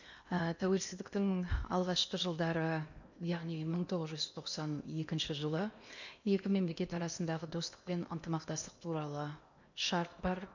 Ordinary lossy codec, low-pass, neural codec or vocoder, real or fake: none; 7.2 kHz; codec, 16 kHz in and 24 kHz out, 0.8 kbps, FocalCodec, streaming, 65536 codes; fake